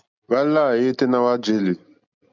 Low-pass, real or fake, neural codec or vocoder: 7.2 kHz; real; none